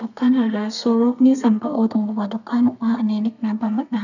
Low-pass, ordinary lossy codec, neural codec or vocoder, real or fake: 7.2 kHz; none; codec, 32 kHz, 1.9 kbps, SNAC; fake